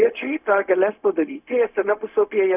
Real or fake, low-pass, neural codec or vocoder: fake; 3.6 kHz; codec, 16 kHz, 0.4 kbps, LongCat-Audio-Codec